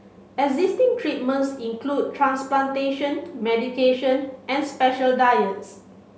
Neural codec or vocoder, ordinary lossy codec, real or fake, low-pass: none; none; real; none